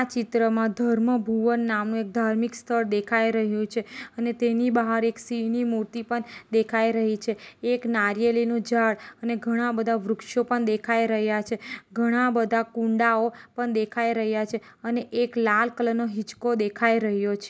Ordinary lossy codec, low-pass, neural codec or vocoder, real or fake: none; none; none; real